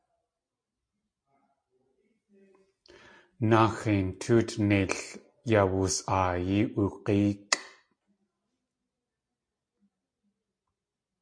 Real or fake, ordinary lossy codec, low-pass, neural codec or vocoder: real; MP3, 48 kbps; 9.9 kHz; none